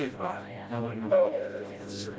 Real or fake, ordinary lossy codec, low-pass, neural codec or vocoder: fake; none; none; codec, 16 kHz, 0.5 kbps, FreqCodec, smaller model